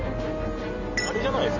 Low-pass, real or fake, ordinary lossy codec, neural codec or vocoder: 7.2 kHz; real; MP3, 64 kbps; none